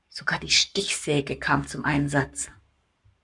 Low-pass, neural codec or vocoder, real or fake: 10.8 kHz; codec, 44.1 kHz, 7.8 kbps, Pupu-Codec; fake